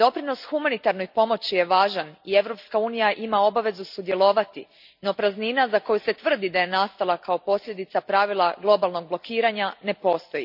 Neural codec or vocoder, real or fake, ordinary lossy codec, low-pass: none; real; none; 5.4 kHz